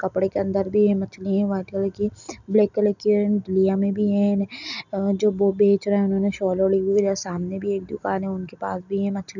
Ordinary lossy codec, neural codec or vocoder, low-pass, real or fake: none; none; 7.2 kHz; real